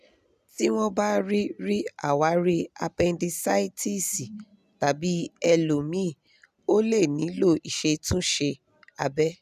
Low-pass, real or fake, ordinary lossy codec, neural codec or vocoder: 14.4 kHz; real; none; none